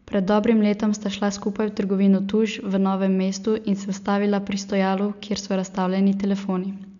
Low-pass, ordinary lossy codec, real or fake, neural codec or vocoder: 7.2 kHz; none; real; none